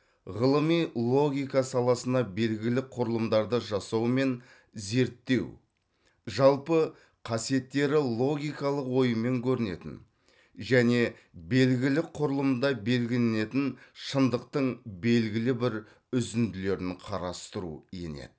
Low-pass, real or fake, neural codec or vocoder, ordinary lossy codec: none; real; none; none